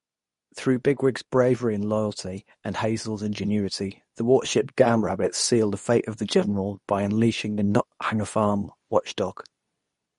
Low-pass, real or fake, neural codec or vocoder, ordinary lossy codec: 10.8 kHz; fake; codec, 24 kHz, 0.9 kbps, WavTokenizer, medium speech release version 2; MP3, 48 kbps